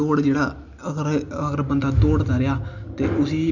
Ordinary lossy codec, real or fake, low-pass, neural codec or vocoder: none; real; 7.2 kHz; none